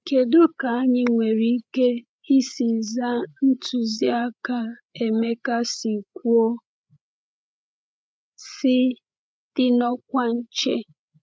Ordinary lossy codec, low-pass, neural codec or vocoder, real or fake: none; none; codec, 16 kHz, 8 kbps, FreqCodec, larger model; fake